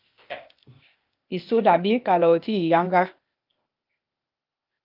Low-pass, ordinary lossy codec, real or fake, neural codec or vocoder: 5.4 kHz; Opus, 32 kbps; fake; codec, 16 kHz, 0.8 kbps, ZipCodec